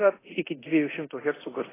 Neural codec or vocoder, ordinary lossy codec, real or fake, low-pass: codec, 24 kHz, 0.9 kbps, DualCodec; AAC, 16 kbps; fake; 3.6 kHz